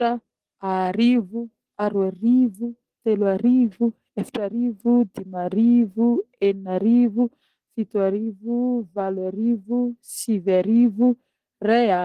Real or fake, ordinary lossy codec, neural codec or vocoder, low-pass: real; Opus, 16 kbps; none; 14.4 kHz